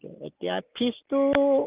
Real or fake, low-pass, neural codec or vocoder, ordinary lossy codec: real; 3.6 kHz; none; Opus, 24 kbps